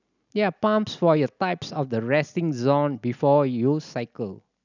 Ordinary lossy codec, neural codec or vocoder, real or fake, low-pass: none; none; real; 7.2 kHz